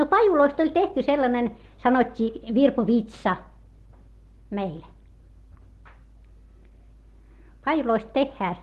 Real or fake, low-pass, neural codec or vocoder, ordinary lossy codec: real; 14.4 kHz; none; Opus, 16 kbps